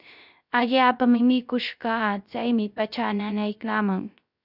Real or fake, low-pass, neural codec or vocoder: fake; 5.4 kHz; codec, 16 kHz, 0.3 kbps, FocalCodec